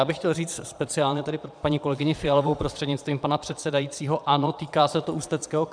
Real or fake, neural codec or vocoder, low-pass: fake; vocoder, 22.05 kHz, 80 mel bands, Vocos; 9.9 kHz